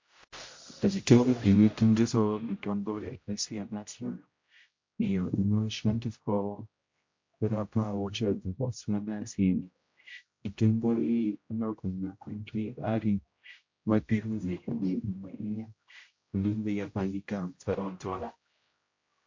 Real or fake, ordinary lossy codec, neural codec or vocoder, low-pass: fake; MP3, 48 kbps; codec, 16 kHz, 0.5 kbps, X-Codec, HuBERT features, trained on general audio; 7.2 kHz